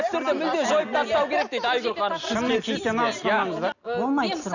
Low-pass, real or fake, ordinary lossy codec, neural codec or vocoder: 7.2 kHz; real; none; none